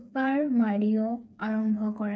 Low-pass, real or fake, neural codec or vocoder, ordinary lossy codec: none; fake; codec, 16 kHz, 4 kbps, FreqCodec, smaller model; none